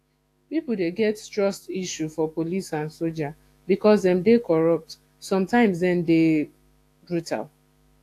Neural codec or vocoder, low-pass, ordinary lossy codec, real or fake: autoencoder, 48 kHz, 128 numbers a frame, DAC-VAE, trained on Japanese speech; 14.4 kHz; AAC, 64 kbps; fake